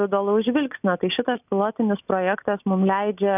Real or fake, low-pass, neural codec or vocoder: real; 3.6 kHz; none